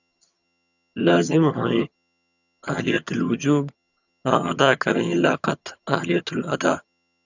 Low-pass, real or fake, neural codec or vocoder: 7.2 kHz; fake; vocoder, 22.05 kHz, 80 mel bands, HiFi-GAN